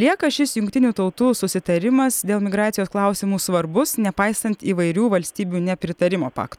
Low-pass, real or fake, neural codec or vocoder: 19.8 kHz; real; none